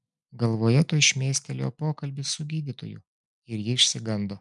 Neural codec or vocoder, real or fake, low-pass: none; real; 10.8 kHz